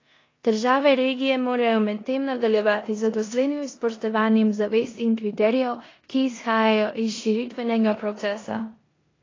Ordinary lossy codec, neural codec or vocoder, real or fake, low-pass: AAC, 32 kbps; codec, 16 kHz in and 24 kHz out, 0.9 kbps, LongCat-Audio-Codec, four codebook decoder; fake; 7.2 kHz